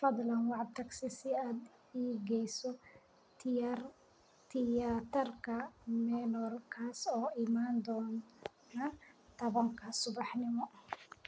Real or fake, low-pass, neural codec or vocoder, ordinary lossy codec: real; none; none; none